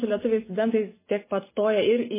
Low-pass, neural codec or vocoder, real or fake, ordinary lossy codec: 3.6 kHz; none; real; MP3, 16 kbps